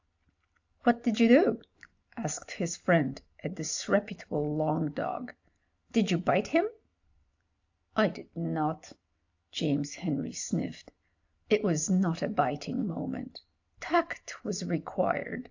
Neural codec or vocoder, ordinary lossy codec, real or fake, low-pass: none; AAC, 48 kbps; real; 7.2 kHz